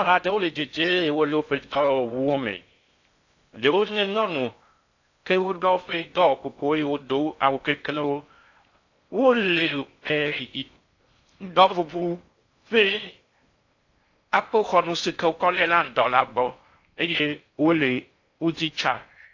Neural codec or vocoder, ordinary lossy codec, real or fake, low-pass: codec, 16 kHz in and 24 kHz out, 0.6 kbps, FocalCodec, streaming, 2048 codes; AAC, 48 kbps; fake; 7.2 kHz